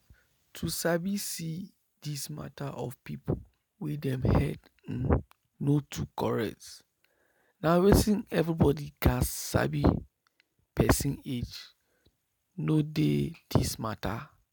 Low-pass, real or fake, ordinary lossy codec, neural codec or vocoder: none; real; none; none